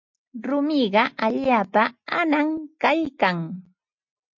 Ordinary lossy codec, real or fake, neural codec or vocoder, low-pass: MP3, 48 kbps; real; none; 7.2 kHz